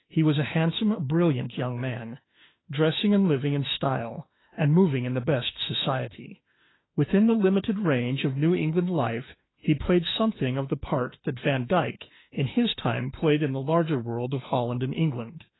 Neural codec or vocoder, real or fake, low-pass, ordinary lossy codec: autoencoder, 48 kHz, 32 numbers a frame, DAC-VAE, trained on Japanese speech; fake; 7.2 kHz; AAC, 16 kbps